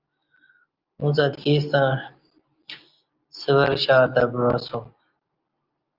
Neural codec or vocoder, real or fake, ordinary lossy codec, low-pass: none; real; Opus, 24 kbps; 5.4 kHz